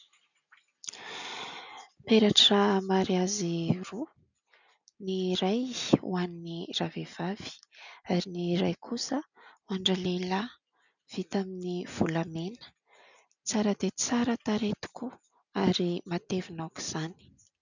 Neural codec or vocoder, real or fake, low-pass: none; real; 7.2 kHz